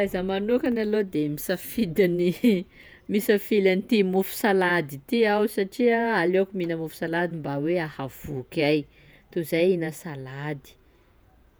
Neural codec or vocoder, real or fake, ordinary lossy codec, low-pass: vocoder, 48 kHz, 128 mel bands, Vocos; fake; none; none